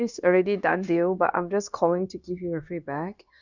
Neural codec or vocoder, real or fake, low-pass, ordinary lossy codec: codec, 16 kHz, 0.9 kbps, LongCat-Audio-Codec; fake; 7.2 kHz; none